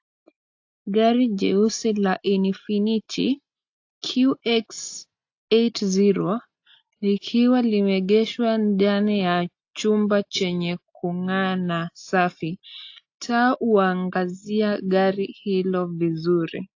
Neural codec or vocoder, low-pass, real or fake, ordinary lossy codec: none; 7.2 kHz; real; AAC, 48 kbps